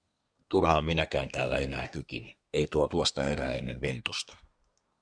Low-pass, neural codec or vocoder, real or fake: 9.9 kHz; codec, 24 kHz, 1 kbps, SNAC; fake